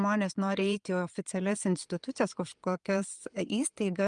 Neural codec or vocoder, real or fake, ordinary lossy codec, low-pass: vocoder, 22.05 kHz, 80 mel bands, Vocos; fake; Opus, 32 kbps; 9.9 kHz